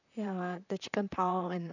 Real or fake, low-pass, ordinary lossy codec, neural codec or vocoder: fake; 7.2 kHz; none; vocoder, 44.1 kHz, 128 mel bands, Pupu-Vocoder